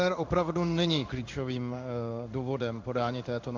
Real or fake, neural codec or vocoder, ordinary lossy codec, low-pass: fake; codec, 16 kHz in and 24 kHz out, 1 kbps, XY-Tokenizer; MP3, 64 kbps; 7.2 kHz